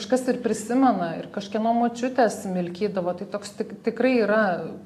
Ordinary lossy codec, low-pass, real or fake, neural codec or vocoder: AAC, 64 kbps; 14.4 kHz; real; none